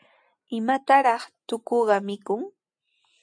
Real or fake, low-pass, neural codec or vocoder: real; 9.9 kHz; none